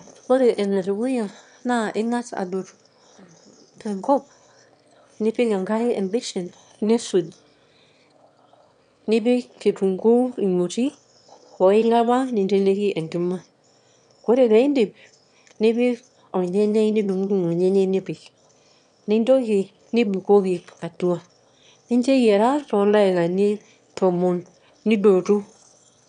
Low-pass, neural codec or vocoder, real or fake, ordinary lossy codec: 9.9 kHz; autoencoder, 22.05 kHz, a latent of 192 numbers a frame, VITS, trained on one speaker; fake; none